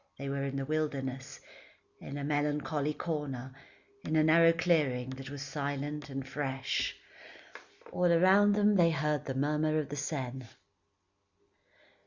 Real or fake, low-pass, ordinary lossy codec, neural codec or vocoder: real; 7.2 kHz; Opus, 64 kbps; none